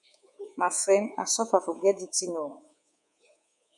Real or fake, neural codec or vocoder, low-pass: fake; codec, 24 kHz, 3.1 kbps, DualCodec; 10.8 kHz